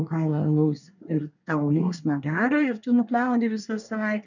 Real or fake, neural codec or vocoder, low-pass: fake; codec, 24 kHz, 1 kbps, SNAC; 7.2 kHz